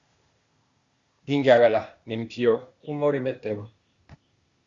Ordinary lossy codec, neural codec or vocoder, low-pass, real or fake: Opus, 64 kbps; codec, 16 kHz, 0.8 kbps, ZipCodec; 7.2 kHz; fake